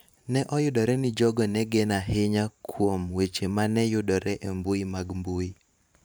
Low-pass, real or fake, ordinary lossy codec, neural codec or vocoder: none; fake; none; vocoder, 44.1 kHz, 128 mel bands every 512 samples, BigVGAN v2